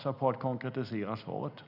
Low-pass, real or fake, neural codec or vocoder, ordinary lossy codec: 5.4 kHz; real; none; none